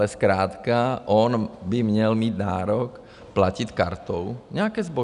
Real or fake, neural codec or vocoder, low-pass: real; none; 10.8 kHz